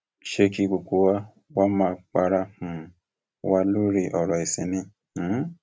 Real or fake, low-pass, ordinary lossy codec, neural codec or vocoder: real; none; none; none